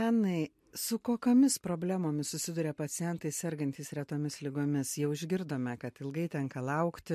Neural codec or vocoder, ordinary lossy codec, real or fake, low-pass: none; MP3, 64 kbps; real; 14.4 kHz